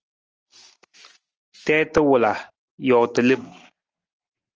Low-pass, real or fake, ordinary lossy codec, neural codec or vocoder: 7.2 kHz; real; Opus, 24 kbps; none